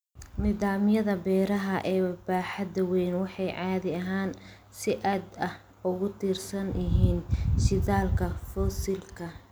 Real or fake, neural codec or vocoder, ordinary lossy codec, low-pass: real; none; none; none